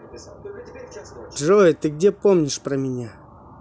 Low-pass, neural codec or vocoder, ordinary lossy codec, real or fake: none; none; none; real